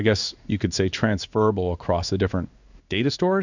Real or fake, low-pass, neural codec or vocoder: fake; 7.2 kHz; codec, 16 kHz in and 24 kHz out, 1 kbps, XY-Tokenizer